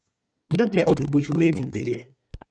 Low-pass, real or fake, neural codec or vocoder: 9.9 kHz; fake; codec, 24 kHz, 1 kbps, SNAC